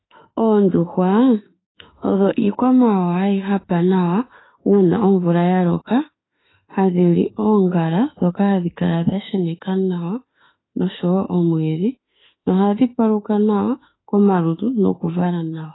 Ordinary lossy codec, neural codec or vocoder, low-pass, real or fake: AAC, 16 kbps; autoencoder, 48 kHz, 32 numbers a frame, DAC-VAE, trained on Japanese speech; 7.2 kHz; fake